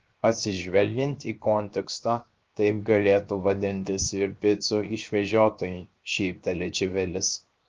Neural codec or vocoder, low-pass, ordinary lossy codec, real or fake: codec, 16 kHz, 0.7 kbps, FocalCodec; 7.2 kHz; Opus, 32 kbps; fake